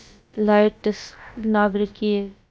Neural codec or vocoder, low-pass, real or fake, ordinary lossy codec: codec, 16 kHz, about 1 kbps, DyCAST, with the encoder's durations; none; fake; none